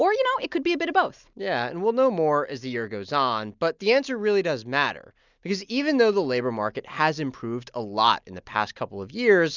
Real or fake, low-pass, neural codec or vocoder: real; 7.2 kHz; none